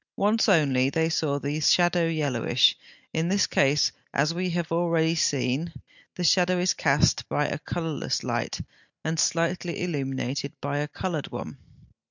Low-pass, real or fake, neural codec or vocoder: 7.2 kHz; real; none